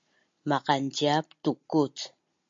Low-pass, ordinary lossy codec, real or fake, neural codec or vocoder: 7.2 kHz; MP3, 48 kbps; real; none